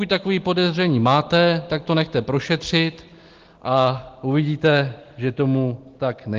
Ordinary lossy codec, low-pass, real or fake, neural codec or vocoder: Opus, 16 kbps; 7.2 kHz; real; none